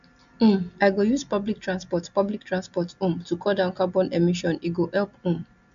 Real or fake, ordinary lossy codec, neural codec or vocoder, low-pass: real; MP3, 64 kbps; none; 7.2 kHz